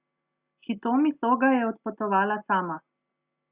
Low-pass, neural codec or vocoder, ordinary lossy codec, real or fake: 3.6 kHz; none; Opus, 64 kbps; real